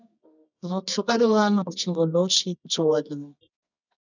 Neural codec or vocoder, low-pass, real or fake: codec, 24 kHz, 0.9 kbps, WavTokenizer, medium music audio release; 7.2 kHz; fake